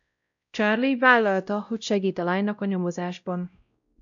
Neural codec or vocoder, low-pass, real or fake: codec, 16 kHz, 0.5 kbps, X-Codec, WavLM features, trained on Multilingual LibriSpeech; 7.2 kHz; fake